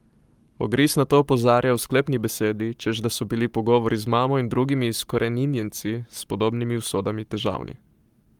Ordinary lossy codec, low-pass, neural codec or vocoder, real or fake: Opus, 32 kbps; 19.8 kHz; codec, 44.1 kHz, 7.8 kbps, Pupu-Codec; fake